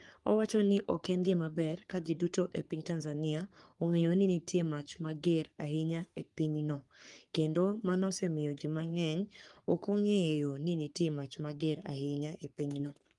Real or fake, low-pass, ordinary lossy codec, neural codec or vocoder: fake; 10.8 kHz; Opus, 32 kbps; codec, 44.1 kHz, 3.4 kbps, Pupu-Codec